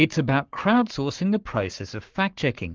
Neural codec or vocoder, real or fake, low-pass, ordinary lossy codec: codec, 44.1 kHz, 7.8 kbps, Pupu-Codec; fake; 7.2 kHz; Opus, 24 kbps